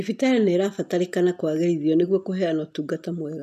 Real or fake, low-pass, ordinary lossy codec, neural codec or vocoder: real; 14.4 kHz; none; none